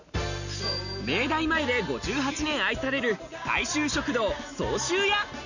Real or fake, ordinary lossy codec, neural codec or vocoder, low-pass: real; none; none; 7.2 kHz